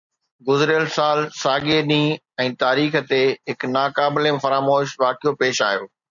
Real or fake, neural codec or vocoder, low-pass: real; none; 7.2 kHz